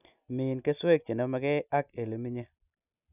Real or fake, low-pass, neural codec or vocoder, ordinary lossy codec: real; 3.6 kHz; none; none